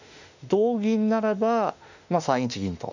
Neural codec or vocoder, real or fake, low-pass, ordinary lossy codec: autoencoder, 48 kHz, 32 numbers a frame, DAC-VAE, trained on Japanese speech; fake; 7.2 kHz; none